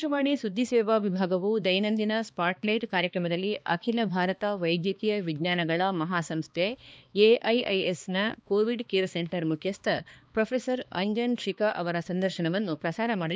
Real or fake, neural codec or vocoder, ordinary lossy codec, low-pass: fake; codec, 16 kHz, 2 kbps, X-Codec, HuBERT features, trained on balanced general audio; none; none